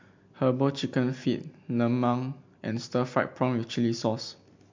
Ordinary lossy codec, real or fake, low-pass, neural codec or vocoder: MP3, 48 kbps; real; 7.2 kHz; none